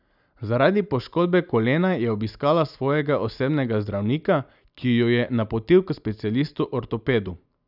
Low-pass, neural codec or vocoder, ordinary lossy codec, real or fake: 5.4 kHz; none; none; real